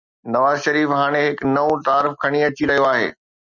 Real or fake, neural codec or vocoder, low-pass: real; none; 7.2 kHz